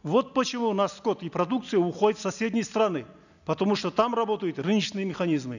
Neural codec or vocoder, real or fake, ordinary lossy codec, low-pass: none; real; none; 7.2 kHz